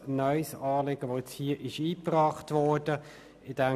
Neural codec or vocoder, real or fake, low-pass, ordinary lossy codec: none; real; 14.4 kHz; none